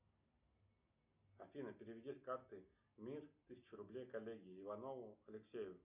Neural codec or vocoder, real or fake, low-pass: none; real; 3.6 kHz